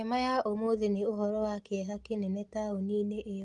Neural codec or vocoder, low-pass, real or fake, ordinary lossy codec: none; 10.8 kHz; real; Opus, 24 kbps